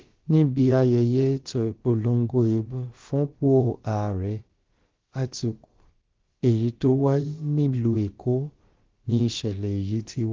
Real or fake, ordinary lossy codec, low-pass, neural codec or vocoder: fake; Opus, 16 kbps; 7.2 kHz; codec, 16 kHz, about 1 kbps, DyCAST, with the encoder's durations